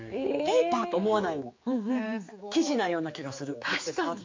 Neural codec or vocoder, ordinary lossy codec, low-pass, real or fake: codec, 16 kHz, 4 kbps, X-Codec, HuBERT features, trained on general audio; MP3, 48 kbps; 7.2 kHz; fake